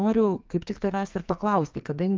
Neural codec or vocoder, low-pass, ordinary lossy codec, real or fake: codec, 44.1 kHz, 2.6 kbps, SNAC; 7.2 kHz; Opus, 24 kbps; fake